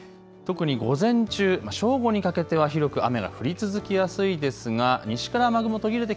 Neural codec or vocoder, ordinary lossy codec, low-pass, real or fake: none; none; none; real